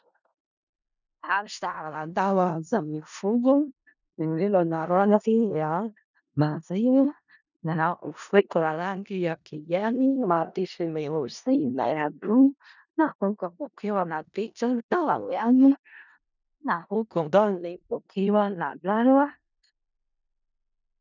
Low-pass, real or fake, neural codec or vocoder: 7.2 kHz; fake; codec, 16 kHz in and 24 kHz out, 0.4 kbps, LongCat-Audio-Codec, four codebook decoder